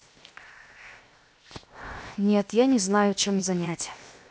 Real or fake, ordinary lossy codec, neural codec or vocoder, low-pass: fake; none; codec, 16 kHz, 0.7 kbps, FocalCodec; none